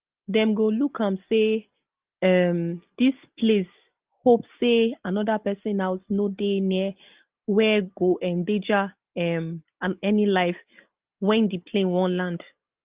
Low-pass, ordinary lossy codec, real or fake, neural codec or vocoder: 3.6 kHz; Opus, 32 kbps; real; none